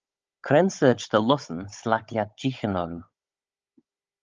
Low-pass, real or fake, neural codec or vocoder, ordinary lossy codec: 7.2 kHz; fake; codec, 16 kHz, 16 kbps, FunCodec, trained on Chinese and English, 50 frames a second; Opus, 24 kbps